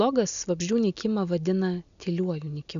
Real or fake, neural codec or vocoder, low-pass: real; none; 7.2 kHz